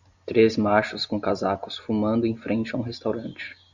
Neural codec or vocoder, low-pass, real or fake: none; 7.2 kHz; real